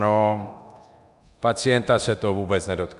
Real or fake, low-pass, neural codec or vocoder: fake; 10.8 kHz; codec, 24 kHz, 0.9 kbps, DualCodec